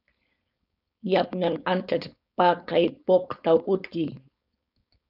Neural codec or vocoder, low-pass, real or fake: codec, 16 kHz, 4.8 kbps, FACodec; 5.4 kHz; fake